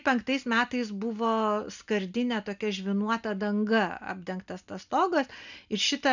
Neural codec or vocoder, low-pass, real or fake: none; 7.2 kHz; real